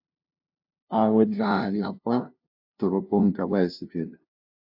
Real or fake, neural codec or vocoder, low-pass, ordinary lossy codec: fake; codec, 16 kHz, 0.5 kbps, FunCodec, trained on LibriTTS, 25 frames a second; 5.4 kHz; AAC, 48 kbps